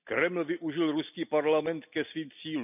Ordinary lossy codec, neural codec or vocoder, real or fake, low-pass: none; none; real; 3.6 kHz